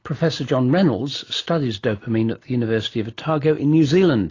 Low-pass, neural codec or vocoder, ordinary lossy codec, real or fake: 7.2 kHz; none; AAC, 32 kbps; real